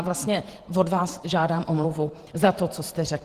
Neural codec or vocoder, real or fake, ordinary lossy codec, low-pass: vocoder, 48 kHz, 128 mel bands, Vocos; fake; Opus, 16 kbps; 14.4 kHz